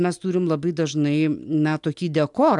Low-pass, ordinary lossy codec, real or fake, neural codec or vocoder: 10.8 kHz; MP3, 96 kbps; real; none